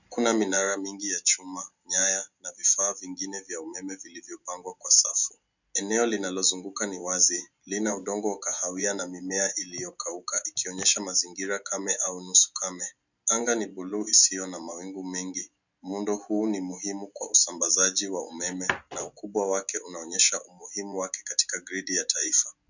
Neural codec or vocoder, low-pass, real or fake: none; 7.2 kHz; real